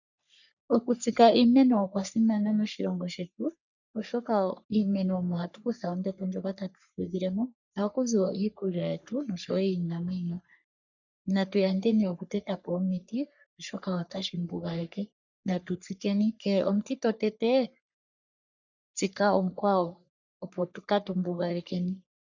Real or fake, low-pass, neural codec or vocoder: fake; 7.2 kHz; codec, 44.1 kHz, 3.4 kbps, Pupu-Codec